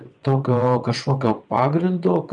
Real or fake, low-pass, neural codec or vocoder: fake; 9.9 kHz; vocoder, 22.05 kHz, 80 mel bands, WaveNeXt